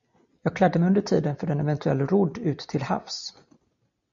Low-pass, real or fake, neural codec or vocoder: 7.2 kHz; real; none